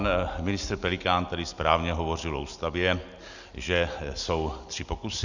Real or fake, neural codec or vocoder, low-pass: real; none; 7.2 kHz